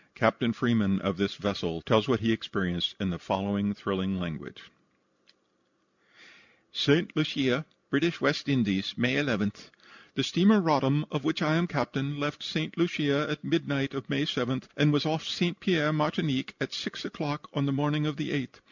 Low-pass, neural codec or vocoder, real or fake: 7.2 kHz; none; real